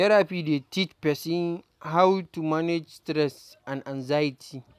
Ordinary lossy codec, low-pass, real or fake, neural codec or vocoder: none; 14.4 kHz; real; none